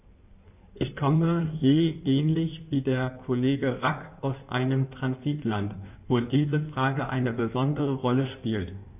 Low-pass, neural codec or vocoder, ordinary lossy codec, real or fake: 3.6 kHz; codec, 16 kHz in and 24 kHz out, 1.1 kbps, FireRedTTS-2 codec; none; fake